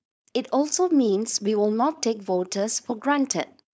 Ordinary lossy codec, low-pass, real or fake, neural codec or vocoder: none; none; fake; codec, 16 kHz, 4.8 kbps, FACodec